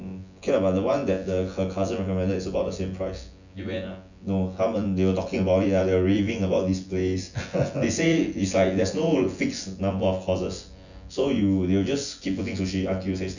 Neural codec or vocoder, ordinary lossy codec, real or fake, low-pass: vocoder, 24 kHz, 100 mel bands, Vocos; none; fake; 7.2 kHz